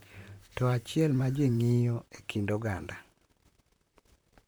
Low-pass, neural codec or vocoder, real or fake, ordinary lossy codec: none; vocoder, 44.1 kHz, 128 mel bands, Pupu-Vocoder; fake; none